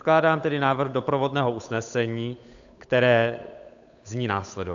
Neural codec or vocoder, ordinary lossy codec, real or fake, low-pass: codec, 16 kHz, 8 kbps, FunCodec, trained on Chinese and English, 25 frames a second; AAC, 64 kbps; fake; 7.2 kHz